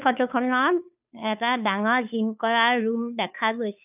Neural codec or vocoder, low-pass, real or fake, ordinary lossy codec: codec, 16 kHz, 2 kbps, FunCodec, trained on Chinese and English, 25 frames a second; 3.6 kHz; fake; none